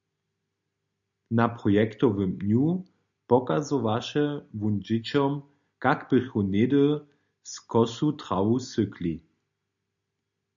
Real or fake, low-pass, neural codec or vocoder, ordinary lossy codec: real; 7.2 kHz; none; MP3, 96 kbps